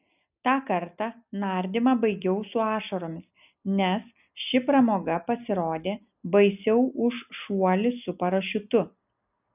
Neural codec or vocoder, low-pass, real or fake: none; 3.6 kHz; real